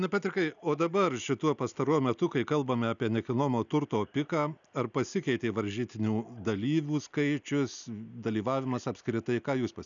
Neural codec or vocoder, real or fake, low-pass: none; real; 7.2 kHz